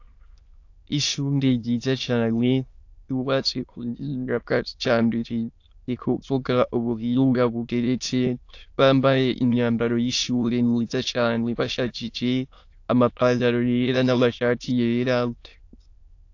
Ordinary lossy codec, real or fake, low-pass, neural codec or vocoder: AAC, 48 kbps; fake; 7.2 kHz; autoencoder, 22.05 kHz, a latent of 192 numbers a frame, VITS, trained on many speakers